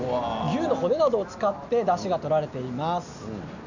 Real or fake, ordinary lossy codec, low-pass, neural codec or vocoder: real; none; 7.2 kHz; none